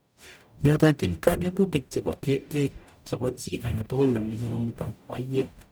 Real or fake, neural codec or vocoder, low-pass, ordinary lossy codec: fake; codec, 44.1 kHz, 0.9 kbps, DAC; none; none